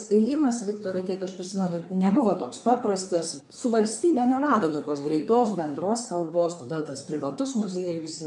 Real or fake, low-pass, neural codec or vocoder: fake; 10.8 kHz; codec, 24 kHz, 1 kbps, SNAC